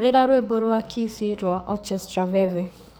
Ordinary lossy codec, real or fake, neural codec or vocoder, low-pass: none; fake; codec, 44.1 kHz, 2.6 kbps, SNAC; none